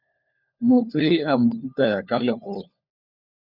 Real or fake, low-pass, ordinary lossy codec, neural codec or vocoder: fake; 5.4 kHz; Opus, 64 kbps; codec, 16 kHz, 4 kbps, FunCodec, trained on LibriTTS, 50 frames a second